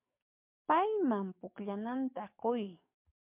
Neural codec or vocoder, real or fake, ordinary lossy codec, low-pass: codec, 44.1 kHz, 7.8 kbps, DAC; fake; MP3, 32 kbps; 3.6 kHz